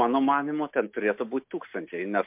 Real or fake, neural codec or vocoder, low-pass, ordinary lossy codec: real; none; 3.6 kHz; MP3, 32 kbps